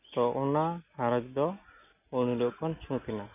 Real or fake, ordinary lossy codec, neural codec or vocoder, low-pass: real; none; none; 3.6 kHz